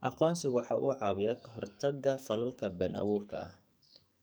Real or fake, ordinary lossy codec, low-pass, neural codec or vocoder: fake; none; none; codec, 44.1 kHz, 2.6 kbps, SNAC